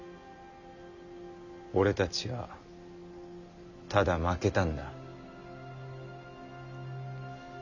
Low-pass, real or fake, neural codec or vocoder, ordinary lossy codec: 7.2 kHz; real; none; none